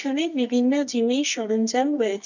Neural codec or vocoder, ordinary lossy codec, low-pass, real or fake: codec, 24 kHz, 0.9 kbps, WavTokenizer, medium music audio release; none; 7.2 kHz; fake